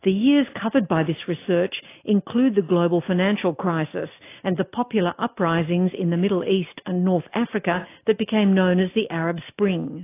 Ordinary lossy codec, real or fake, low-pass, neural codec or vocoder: AAC, 24 kbps; real; 3.6 kHz; none